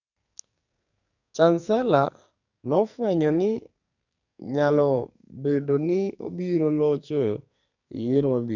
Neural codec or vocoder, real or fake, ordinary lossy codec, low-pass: codec, 44.1 kHz, 2.6 kbps, SNAC; fake; none; 7.2 kHz